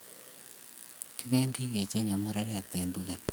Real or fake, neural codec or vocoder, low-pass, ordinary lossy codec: fake; codec, 44.1 kHz, 2.6 kbps, SNAC; none; none